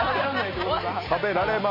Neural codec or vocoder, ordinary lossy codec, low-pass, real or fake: none; MP3, 24 kbps; 5.4 kHz; real